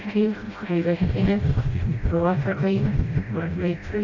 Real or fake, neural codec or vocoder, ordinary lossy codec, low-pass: fake; codec, 16 kHz, 0.5 kbps, FreqCodec, smaller model; AAC, 48 kbps; 7.2 kHz